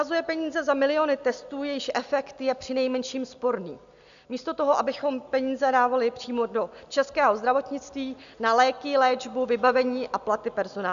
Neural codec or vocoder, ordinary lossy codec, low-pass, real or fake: none; AAC, 96 kbps; 7.2 kHz; real